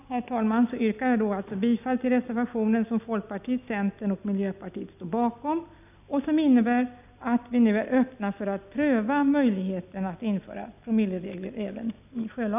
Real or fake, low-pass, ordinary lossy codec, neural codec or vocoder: real; 3.6 kHz; none; none